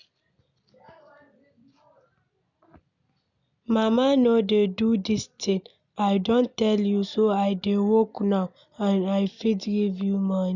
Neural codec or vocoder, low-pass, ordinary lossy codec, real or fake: none; 7.2 kHz; none; real